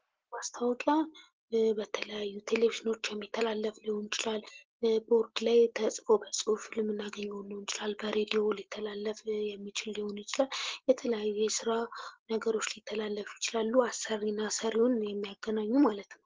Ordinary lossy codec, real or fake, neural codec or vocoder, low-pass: Opus, 16 kbps; real; none; 7.2 kHz